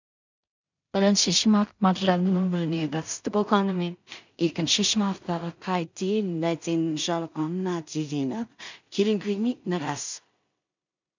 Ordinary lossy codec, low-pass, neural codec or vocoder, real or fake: none; 7.2 kHz; codec, 16 kHz in and 24 kHz out, 0.4 kbps, LongCat-Audio-Codec, two codebook decoder; fake